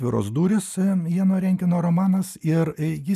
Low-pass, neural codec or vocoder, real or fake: 14.4 kHz; none; real